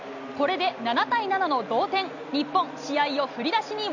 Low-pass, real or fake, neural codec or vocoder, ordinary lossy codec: 7.2 kHz; real; none; none